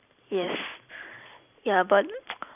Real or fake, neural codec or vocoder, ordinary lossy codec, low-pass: fake; vocoder, 44.1 kHz, 128 mel bands every 512 samples, BigVGAN v2; none; 3.6 kHz